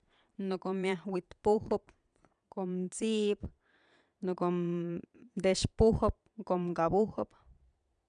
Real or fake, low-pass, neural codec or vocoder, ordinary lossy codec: fake; 9.9 kHz; vocoder, 22.05 kHz, 80 mel bands, Vocos; none